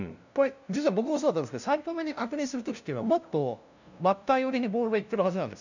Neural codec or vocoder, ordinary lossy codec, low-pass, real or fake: codec, 16 kHz, 0.5 kbps, FunCodec, trained on LibriTTS, 25 frames a second; none; 7.2 kHz; fake